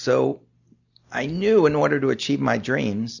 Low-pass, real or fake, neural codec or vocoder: 7.2 kHz; real; none